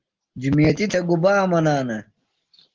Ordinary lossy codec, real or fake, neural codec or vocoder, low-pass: Opus, 16 kbps; real; none; 7.2 kHz